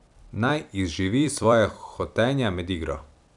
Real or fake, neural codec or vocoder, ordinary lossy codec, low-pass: fake; vocoder, 44.1 kHz, 128 mel bands every 256 samples, BigVGAN v2; none; 10.8 kHz